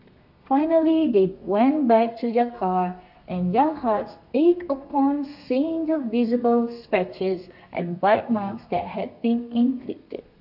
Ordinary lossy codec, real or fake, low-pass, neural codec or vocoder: none; fake; 5.4 kHz; codec, 32 kHz, 1.9 kbps, SNAC